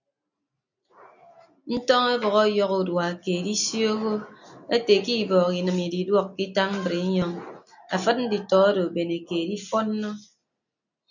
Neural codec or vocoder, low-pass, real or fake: none; 7.2 kHz; real